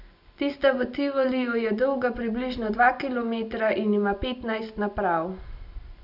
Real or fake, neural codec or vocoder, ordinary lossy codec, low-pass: real; none; none; 5.4 kHz